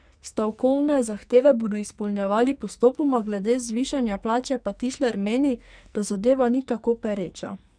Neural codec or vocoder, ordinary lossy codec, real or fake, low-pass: codec, 44.1 kHz, 2.6 kbps, SNAC; none; fake; 9.9 kHz